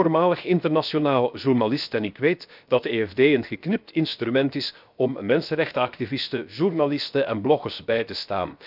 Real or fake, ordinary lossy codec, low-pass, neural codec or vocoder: fake; none; 5.4 kHz; codec, 16 kHz, 0.7 kbps, FocalCodec